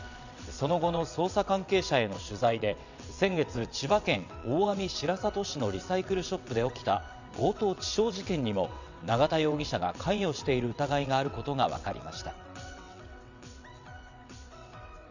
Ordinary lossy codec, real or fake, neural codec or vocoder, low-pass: none; fake; vocoder, 22.05 kHz, 80 mel bands, WaveNeXt; 7.2 kHz